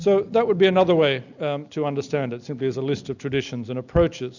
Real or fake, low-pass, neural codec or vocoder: real; 7.2 kHz; none